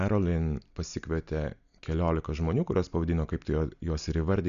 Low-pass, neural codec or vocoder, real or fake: 7.2 kHz; none; real